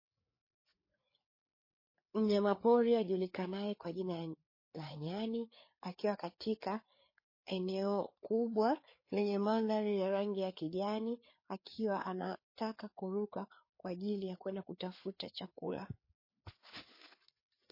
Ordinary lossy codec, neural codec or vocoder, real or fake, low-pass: MP3, 24 kbps; codec, 16 kHz, 4 kbps, FreqCodec, larger model; fake; 5.4 kHz